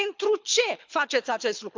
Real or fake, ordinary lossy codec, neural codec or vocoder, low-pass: fake; MP3, 64 kbps; codec, 24 kHz, 6 kbps, HILCodec; 7.2 kHz